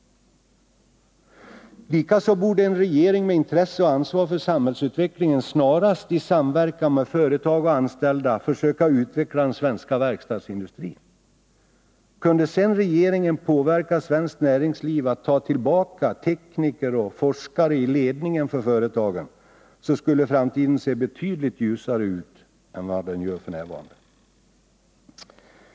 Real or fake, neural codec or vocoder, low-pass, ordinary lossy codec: real; none; none; none